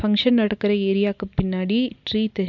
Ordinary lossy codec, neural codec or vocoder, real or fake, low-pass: none; none; real; 7.2 kHz